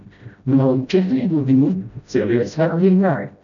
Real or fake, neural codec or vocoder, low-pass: fake; codec, 16 kHz, 0.5 kbps, FreqCodec, smaller model; 7.2 kHz